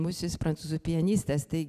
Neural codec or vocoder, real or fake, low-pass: none; real; 14.4 kHz